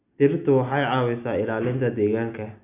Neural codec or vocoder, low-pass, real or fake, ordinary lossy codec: none; 3.6 kHz; real; none